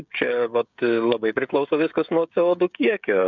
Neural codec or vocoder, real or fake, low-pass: codec, 16 kHz, 16 kbps, FreqCodec, smaller model; fake; 7.2 kHz